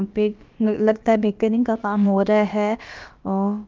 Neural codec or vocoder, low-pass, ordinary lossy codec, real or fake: codec, 16 kHz, about 1 kbps, DyCAST, with the encoder's durations; 7.2 kHz; Opus, 32 kbps; fake